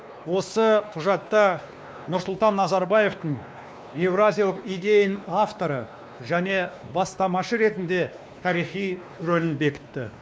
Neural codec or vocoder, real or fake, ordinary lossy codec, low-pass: codec, 16 kHz, 2 kbps, X-Codec, WavLM features, trained on Multilingual LibriSpeech; fake; none; none